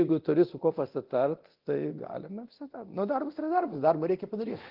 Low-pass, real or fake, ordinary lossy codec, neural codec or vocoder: 5.4 kHz; fake; Opus, 16 kbps; codec, 24 kHz, 0.9 kbps, DualCodec